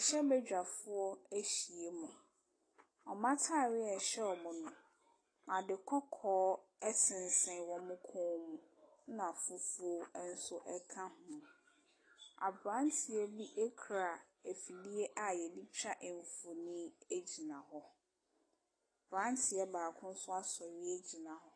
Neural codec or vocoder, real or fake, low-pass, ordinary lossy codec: none; real; 9.9 kHz; AAC, 32 kbps